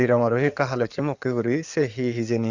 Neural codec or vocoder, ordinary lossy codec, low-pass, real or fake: codec, 16 kHz in and 24 kHz out, 2.2 kbps, FireRedTTS-2 codec; Opus, 64 kbps; 7.2 kHz; fake